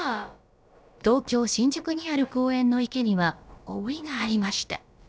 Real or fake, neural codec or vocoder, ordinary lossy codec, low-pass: fake; codec, 16 kHz, about 1 kbps, DyCAST, with the encoder's durations; none; none